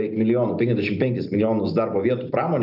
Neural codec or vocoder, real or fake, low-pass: none; real; 5.4 kHz